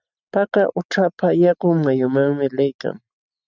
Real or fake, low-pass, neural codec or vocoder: real; 7.2 kHz; none